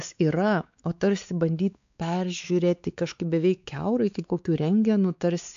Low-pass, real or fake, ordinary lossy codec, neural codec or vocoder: 7.2 kHz; fake; AAC, 64 kbps; codec, 16 kHz, 4 kbps, X-Codec, WavLM features, trained on Multilingual LibriSpeech